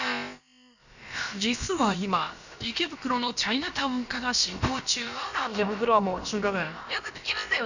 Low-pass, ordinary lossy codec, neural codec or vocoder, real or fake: 7.2 kHz; none; codec, 16 kHz, about 1 kbps, DyCAST, with the encoder's durations; fake